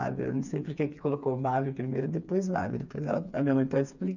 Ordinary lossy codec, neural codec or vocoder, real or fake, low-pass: none; codec, 16 kHz, 4 kbps, FreqCodec, smaller model; fake; 7.2 kHz